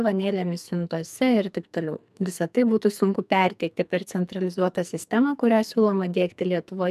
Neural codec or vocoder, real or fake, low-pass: codec, 44.1 kHz, 2.6 kbps, SNAC; fake; 14.4 kHz